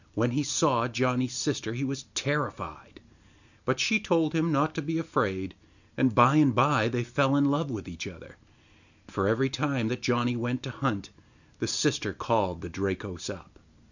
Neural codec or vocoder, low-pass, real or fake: none; 7.2 kHz; real